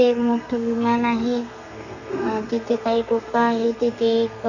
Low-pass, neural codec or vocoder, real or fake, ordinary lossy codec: 7.2 kHz; codec, 32 kHz, 1.9 kbps, SNAC; fake; none